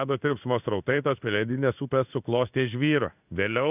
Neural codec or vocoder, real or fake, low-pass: codec, 16 kHz in and 24 kHz out, 1 kbps, XY-Tokenizer; fake; 3.6 kHz